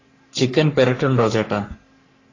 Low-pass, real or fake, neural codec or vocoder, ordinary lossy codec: 7.2 kHz; fake; codec, 44.1 kHz, 3.4 kbps, Pupu-Codec; AAC, 32 kbps